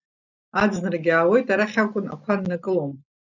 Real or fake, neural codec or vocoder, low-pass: real; none; 7.2 kHz